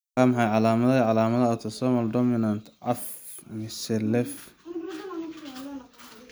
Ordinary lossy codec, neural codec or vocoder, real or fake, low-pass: none; none; real; none